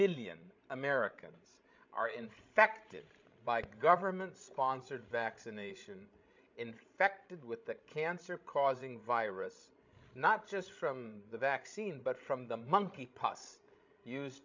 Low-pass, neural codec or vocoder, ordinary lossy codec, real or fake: 7.2 kHz; codec, 16 kHz, 16 kbps, FreqCodec, larger model; MP3, 64 kbps; fake